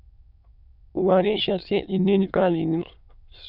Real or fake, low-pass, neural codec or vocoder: fake; 5.4 kHz; autoencoder, 22.05 kHz, a latent of 192 numbers a frame, VITS, trained on many speakers